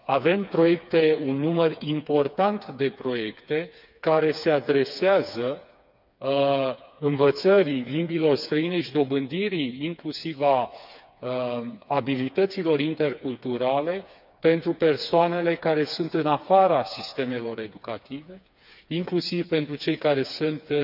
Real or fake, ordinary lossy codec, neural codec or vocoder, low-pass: fake; none; codec, 16 kHz, 4 kbps, FreqCodec, smaller model; 5.4 kHz